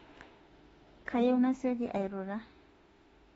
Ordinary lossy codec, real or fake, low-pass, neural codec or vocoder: AAC, 24 kbps; fake; 19.8 kHz; autoencoder, 48 kHz, 32 numbers a frame, DAC-VAE, trained on Japanese speech